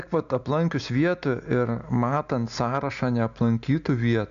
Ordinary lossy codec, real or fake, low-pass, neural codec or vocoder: AAC, 96 kbps; real; 7.2 kHz; none